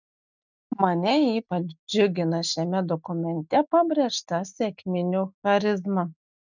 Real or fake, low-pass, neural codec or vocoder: real; 7.2 kHz; none